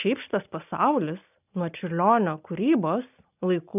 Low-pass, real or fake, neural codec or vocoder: 3.6 kHz; real; none